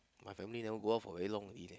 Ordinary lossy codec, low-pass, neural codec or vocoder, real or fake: none; none; none; real